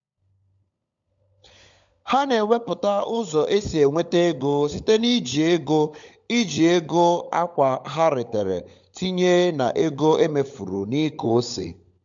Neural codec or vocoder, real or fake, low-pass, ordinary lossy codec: codec, 16 kHz, 16 kbps, FunCodec, trained on LibriTTS, 50 frames a second; fake; 7.2 kHz; MP3, 64 kbps